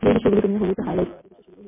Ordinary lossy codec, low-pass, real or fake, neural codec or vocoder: MP3, 16 kbps; 3.6 kHz; real; none